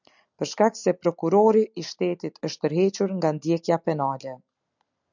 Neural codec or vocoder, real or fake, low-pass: none; real; 7.2 kHz